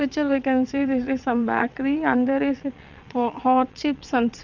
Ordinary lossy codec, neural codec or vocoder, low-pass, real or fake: none; vocoder, 22.05 kHz, 80 mel bands, WaveNeXt; 7.2 kHz; fake